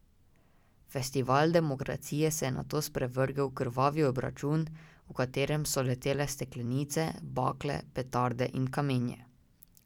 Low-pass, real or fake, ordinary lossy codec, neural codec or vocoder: 19.8 kHz; real; none; none